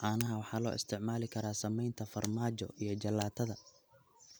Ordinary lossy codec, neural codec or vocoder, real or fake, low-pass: none; none; real; none